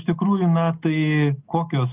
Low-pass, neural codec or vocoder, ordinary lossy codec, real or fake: 3.6 kHz; none; Opus, 32 kbps; real